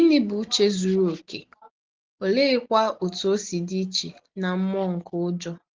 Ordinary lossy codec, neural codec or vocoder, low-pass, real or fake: Opus, 16 kbps; none; 7.2 kHz; real